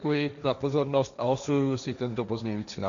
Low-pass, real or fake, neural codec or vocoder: 7.2 kHz; fake; codec, 16 kHz, 1.1 kbps, Voila-Tokenizer